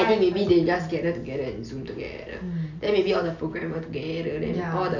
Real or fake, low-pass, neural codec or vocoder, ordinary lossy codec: real; 7.2 kHz; none; none